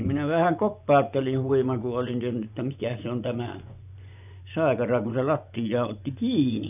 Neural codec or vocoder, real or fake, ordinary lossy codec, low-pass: vocoder, 24 kHz, 100 mel bands, Vocos; fake; none; 3.6 kHz